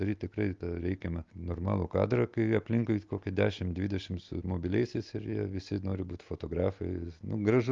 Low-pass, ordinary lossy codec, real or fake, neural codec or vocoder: 7.2 kHz; Opus, 32 kbps; real; none